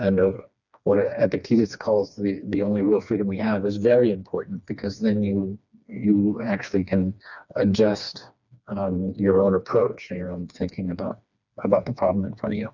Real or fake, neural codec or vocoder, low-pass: fake; codec, 16 kHz, 2 kbps, FreqCodec, smaller model; 7.2 kHz